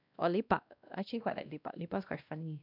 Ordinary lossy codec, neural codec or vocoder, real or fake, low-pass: none; codec, 16 kHz, 1 kbps, X-Codec, WavLM features, trained on Multilingual LibriSpeech; fake; 5.4 kHz